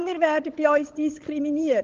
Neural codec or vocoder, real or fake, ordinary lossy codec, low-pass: codec, 16 kHz, 16 kbps, FunCodec, trained on LibriTTS, 50 frames a second; fake; Opus, 16 kbps; 7.2 kHz